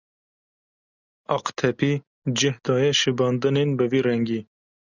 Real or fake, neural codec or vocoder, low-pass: real; none; 7.2 kHz